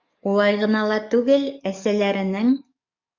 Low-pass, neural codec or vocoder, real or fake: 7.2 kHz; codec, 44.1 kHz, 7.8 kbps, DAC; fake